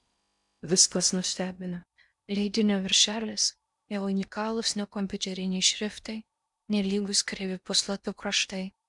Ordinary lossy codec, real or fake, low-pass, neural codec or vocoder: MP3, 96 kbps; fake; 10.8 kHz; codec, 16 kHz in and 24 kHz out, 0.6 kbps, FocalCodec, streaming, 4096 codes